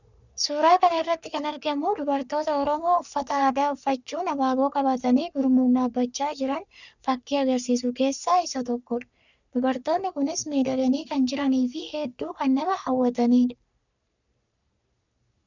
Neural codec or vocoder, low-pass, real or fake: codec, 44.1 kHz, 3.4 kbps, Pupu-Codec; 7.2 kHz; fake